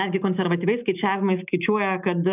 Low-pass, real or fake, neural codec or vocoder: 3.6 kHz; real; none